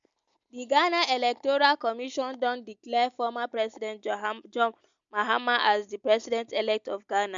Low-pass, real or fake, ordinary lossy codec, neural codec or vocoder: 7.2 kHz; real; MP3, 64 kbps; none